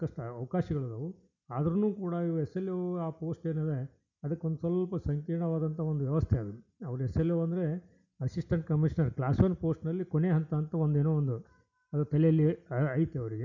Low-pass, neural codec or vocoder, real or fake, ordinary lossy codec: 7.2 kHz; none; real; none